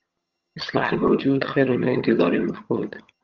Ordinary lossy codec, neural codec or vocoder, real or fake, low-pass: Opus, 24 kbps; vocoder, 22.05 kHz, 80 mel bands, HiFi-GAN; fake; 7.2 kHz